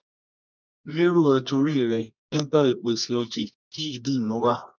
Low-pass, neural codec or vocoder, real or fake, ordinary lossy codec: 7.2 kHz; codec, 24 kHz, 0.9 kbps, WavTokenizer, medium music audio release; fake; none